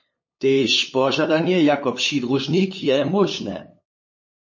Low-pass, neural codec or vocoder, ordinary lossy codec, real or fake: 7.2 kHz; codec, 16 kHz, 8 kbps, FunCodec, trained on LibriTTS, 25 frames a second; MP3, 32 kbps; fake